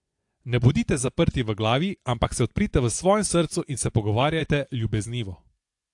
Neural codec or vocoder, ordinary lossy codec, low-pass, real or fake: vocoder, 24 kHz, 100 mel bands, Vocos; AAC, 64 kbps; 10.8 kHz; fake